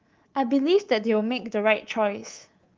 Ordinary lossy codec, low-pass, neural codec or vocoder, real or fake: Opus, 24 kbps; 7.2 kHz; codec, 24 kHz, 3.1 kbps, DualCodec; fake